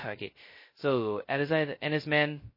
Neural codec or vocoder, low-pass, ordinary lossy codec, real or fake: codec, 16 kHz, 0.2 kbps, FocalCodec; 5.4 kHz; MP3, 24 kbps; fake